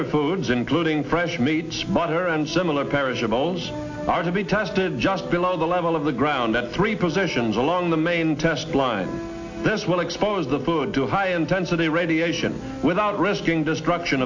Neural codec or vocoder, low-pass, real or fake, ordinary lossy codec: none; 7.2 kHz; real; AAC, 48 kbps